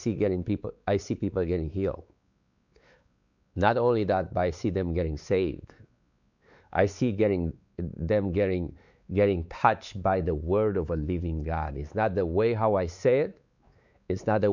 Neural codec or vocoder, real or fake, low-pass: codec, 16 kHz, 4 kbps, X-Codec, WavLM features, trained on Multilingual LibriSpeech; fake; 7.2 kHz